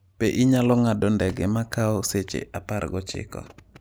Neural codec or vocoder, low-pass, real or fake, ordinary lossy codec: none; none; real; none